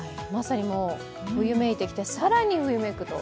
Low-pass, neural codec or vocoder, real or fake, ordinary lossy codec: none; none; real; none